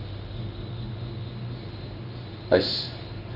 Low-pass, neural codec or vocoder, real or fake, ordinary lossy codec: 5.4 kHz; codec, 16 kHz in and 24 kHz out, 1 kbps, XY-Tokenizer; fake; none